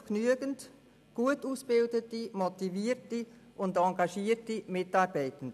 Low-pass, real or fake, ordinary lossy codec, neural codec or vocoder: 14.4 kHz; real; none; none